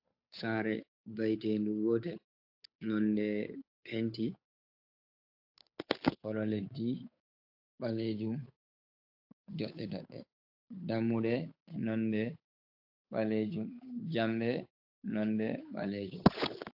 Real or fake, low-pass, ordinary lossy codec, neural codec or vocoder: fake; 5.4 kHz; AAC, 48 kbps; codec, 16 kHz, 8 kbps, FunCodec, trained on Chinese and English, 25 frames a second